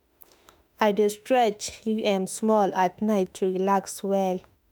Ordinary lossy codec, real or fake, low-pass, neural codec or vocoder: none; fake; none; autoencoder, 48 kHz, 32 numbers a frame, DAC-VAE, trained on Japanese speech